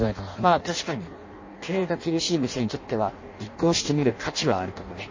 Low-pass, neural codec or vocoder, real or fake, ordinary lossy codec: 7.2 kHz; codec, 16 kHz in and 24 kHz out, 0.6 kbps, FireRedTTS-2 codec; fake; MP3, 32 kbps